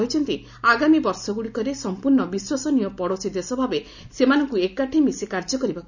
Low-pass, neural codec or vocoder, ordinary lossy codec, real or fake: 7.2 kHz; none; none; real